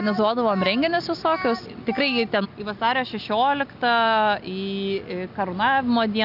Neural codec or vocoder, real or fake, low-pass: none; real; 5.4 kHz